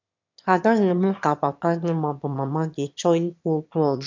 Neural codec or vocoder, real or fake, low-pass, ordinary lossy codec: autoencoder, 22.05 kHz, a latent of 192 numbers a frame, VITS, trained on one speaker; fake; 7.2 kHz; MP3, 64 kbps